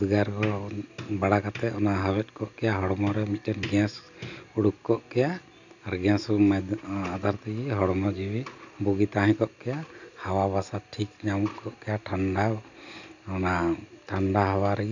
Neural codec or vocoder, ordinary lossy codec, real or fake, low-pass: none; none; real; 7.2 kHz